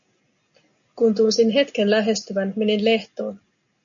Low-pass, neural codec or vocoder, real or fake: 7.2 kHz; none; real